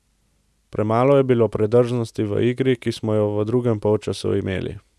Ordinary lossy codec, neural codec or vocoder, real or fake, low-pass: none; none; real; none